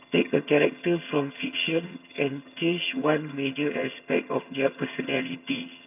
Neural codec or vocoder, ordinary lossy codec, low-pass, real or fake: vocoder, 22.05 kHz, 80 mel bands, HiFi-GAN; none; 3.6 kHz; fake